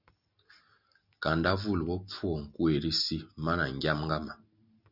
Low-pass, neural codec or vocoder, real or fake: 5.4 kHz; none; real